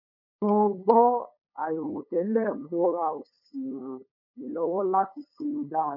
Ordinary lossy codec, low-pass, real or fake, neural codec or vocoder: none; 5.4 kHz; fake; codec, 16 kHz, 8 kbps, FunCodec, trained on LibriTTS, 25 frames a second